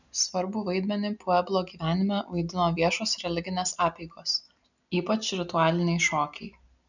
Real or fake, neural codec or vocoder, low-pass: real; none; 7.2 kHz